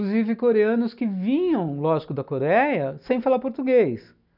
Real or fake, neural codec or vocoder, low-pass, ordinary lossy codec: real; none; 5.4 kHz; none